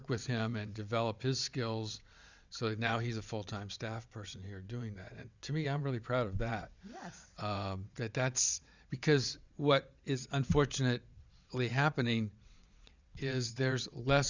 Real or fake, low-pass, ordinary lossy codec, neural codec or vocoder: fake; 7.2 kHz; Opus, 64 kbps; vocoder, 22.05 kHz, 80 mel bands, WaveNeXt